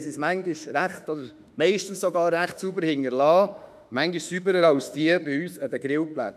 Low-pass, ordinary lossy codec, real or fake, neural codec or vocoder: 14.4 kHz; none; fake; autoencoder, 48 kHz, 32 numbers a frame, DAC-VAE, trained on Japanese speech